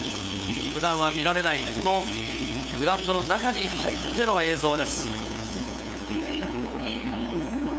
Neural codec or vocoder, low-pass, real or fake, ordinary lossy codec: codec, 16 kHz, 2 kbps, FunCodec, trained on LibriTTS, 25 frames a second; none; fake; none